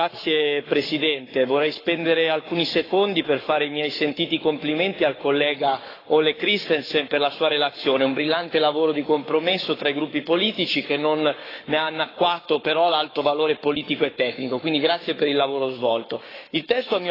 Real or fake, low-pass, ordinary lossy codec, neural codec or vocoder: fake; 5.4 kHz; AAC, 24 kbps; codec, 44.1 kHz, 7.8 kbps, Pupu-Codec